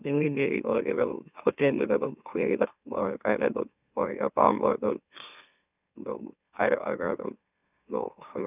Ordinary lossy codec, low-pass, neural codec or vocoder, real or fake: none; 3.6 kHz; autoencoder, 44.1 kHz, a latent of 192 numbers a frame, MeloTTS; fake